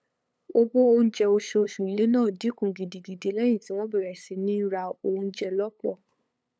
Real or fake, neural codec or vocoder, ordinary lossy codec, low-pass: fake; codec, 16 kHz, 8 kbps, FunCodec, trained on LibriTTS, 25 frames a second; none; none